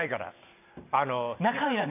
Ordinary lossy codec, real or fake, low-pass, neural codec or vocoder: none; real; 3.6 kHz; none